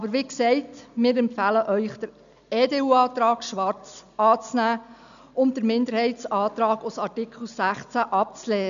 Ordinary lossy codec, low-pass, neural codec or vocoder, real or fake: none; 7.2 kHz; none; real